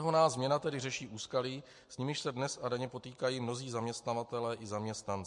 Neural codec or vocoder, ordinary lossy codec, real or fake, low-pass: none; MP3, 48 kbps; real; 10.8 kHz